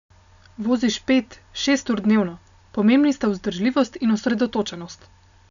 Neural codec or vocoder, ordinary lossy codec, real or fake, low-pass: none; none; real; 7.2 kHz